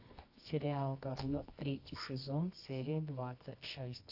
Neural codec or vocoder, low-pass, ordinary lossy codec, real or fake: codec, 24 kHz, 0.9 kbps, WavTokenizer, medium music audio release; 5.4 kHz; AAC, 48 kbps; fake